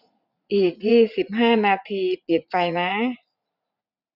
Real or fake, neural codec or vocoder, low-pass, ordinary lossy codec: fake; vocoder, 44.1 kHz, 80 mel bands, Vocos; 5.4 kHz; none